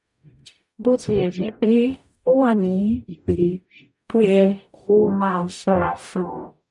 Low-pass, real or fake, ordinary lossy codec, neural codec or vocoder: 10.8 kHz; fake; none; codec, 44.1 kHz, 0.9 kbps, DAC